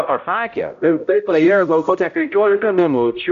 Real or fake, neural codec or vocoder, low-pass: fake; codec, 16 kHz, 0.5 kbps, X-Codec, HuBERT features, trained on balanced general audio; 7.2 kHz